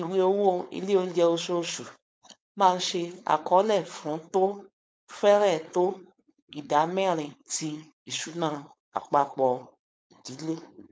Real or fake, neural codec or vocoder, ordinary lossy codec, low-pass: fake; codec, 16 kHz, 4.8 kbps, FACodec; none; none